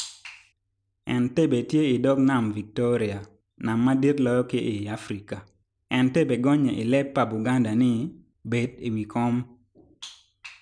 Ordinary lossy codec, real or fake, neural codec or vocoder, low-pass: none; real; none; 9.9 kHz